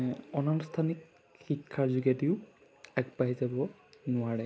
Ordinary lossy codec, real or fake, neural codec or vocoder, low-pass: none; real; none; none